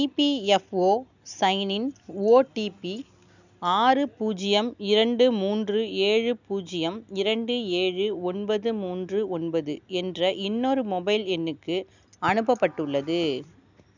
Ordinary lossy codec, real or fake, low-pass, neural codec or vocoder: none; real; 7.2 kHz; none